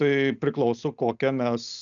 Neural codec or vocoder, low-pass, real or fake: none; 7.2 kHz; real